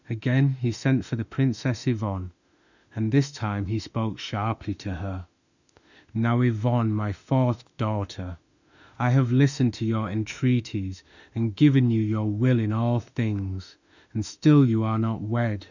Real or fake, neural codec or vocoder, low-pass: fake; autoencoder, 48 kHz, 32 numbers a frame, DAC-VAE, trained on Japanese speech; 7.2 kHz